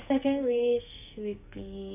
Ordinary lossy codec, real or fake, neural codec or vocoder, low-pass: AAC, 24 kbps; fake; codec, 44.1 kHz, 2.6 kbps, SNAC; 3.6 kHz